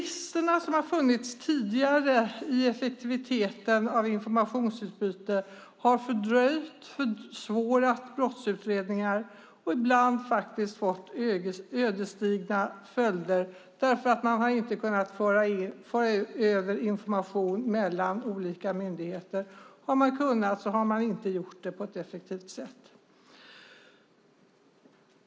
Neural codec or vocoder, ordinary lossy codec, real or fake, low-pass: none; none; real; none